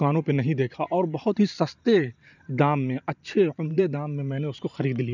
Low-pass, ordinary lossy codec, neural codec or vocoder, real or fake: 7.2 kHz; none; vocoder, 44.1 kHz, 128 mel bands every 512 samples, BigVGAN v2; fake